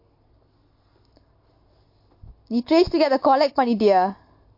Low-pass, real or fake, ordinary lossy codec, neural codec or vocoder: 5.4 kHz; real; MP3, 32 kbps; none